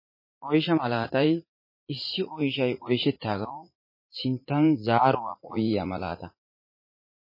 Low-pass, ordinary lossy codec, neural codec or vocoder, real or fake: 5.4 kHz; MP3, 24 kbps; vocoder, 44.1 kHz, 80 mel bands, Vocos; fake